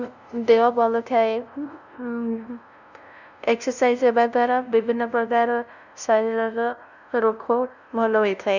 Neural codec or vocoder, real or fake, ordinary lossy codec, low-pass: codec, 16 kHz, 0.5 kbps, FunCodec, trained on LibriTTS, 25 frames a second; fake; none; 7.2 kHz